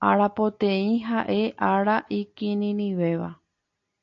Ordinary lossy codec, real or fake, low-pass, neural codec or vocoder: AAC, 48 kbps; real; 7.2 kHz; none